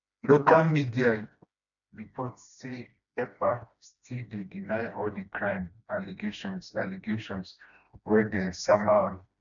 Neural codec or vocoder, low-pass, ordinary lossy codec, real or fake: codec, 16 kHz, 1 kbps, FreqCodec, smaller model; 7.2 kHz; none; fake